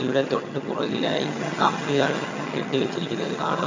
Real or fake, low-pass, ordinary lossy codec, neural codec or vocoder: fake; 7.2 kHz; MP3, 64 kbps; vocoder, 22.05 kHz, 80 mel bands, HiFi-GAN